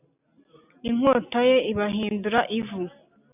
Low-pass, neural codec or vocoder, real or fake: 3.6 kHz; none; real